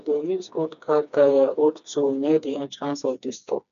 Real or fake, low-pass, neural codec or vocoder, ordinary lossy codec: fake; 7.2 kHz; codec, 16 kHz, 2 kbps, FreqCodec, smaller model; none